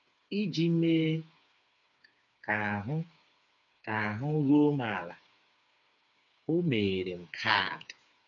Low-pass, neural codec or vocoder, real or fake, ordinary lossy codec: 7.2 kHz; codec, 16 kHz, 4 kbps, FreqCodec, smaller model; fake; none